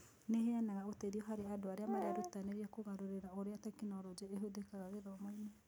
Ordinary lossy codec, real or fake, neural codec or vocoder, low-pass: none; real; none; none